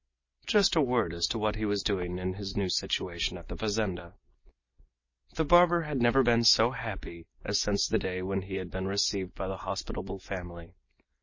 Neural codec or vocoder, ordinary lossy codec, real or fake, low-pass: none; MP3, 32 kbps; real; 7.2 kHz